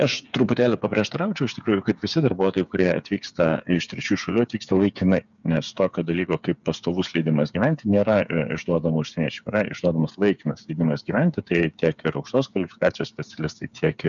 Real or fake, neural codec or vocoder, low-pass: fake; codec, 16 kHz, 8 kbps, FreqCodec, smaller model; 7.2 kHz